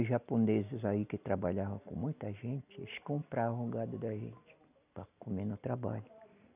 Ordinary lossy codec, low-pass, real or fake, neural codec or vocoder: none; 3.6 kHz; real; none